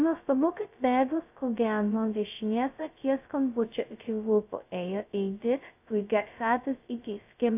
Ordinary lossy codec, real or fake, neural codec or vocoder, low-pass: AAC, 32 kbps; fake; codec, 16 kHz, 0.2 kbps, FocalCodec; 3.6 kHz